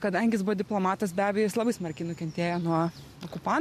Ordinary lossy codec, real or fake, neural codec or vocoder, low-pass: MP3, 64 kbps; real; none; 14.4 kHz